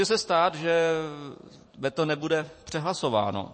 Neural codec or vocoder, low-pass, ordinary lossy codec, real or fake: vocoder, 44.1 kHz, 128 mel bands every 256 samples, BigVGAN v2; 9.9 kHz; MP3, 32 kbps; fake